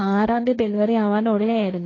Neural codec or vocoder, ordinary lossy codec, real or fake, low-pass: codec, 16 kHz, 1.1 kbps, Voila-Tokenizer; AAC, 32 kbps; fake; 7.2 kHz